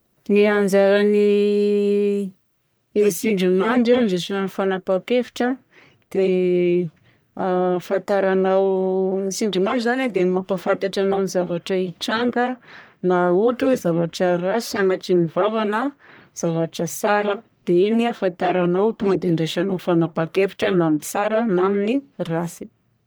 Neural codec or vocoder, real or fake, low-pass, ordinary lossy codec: codec, 44.1 kHz, 1.7 kbps, Pupu-Codec; fake; none; none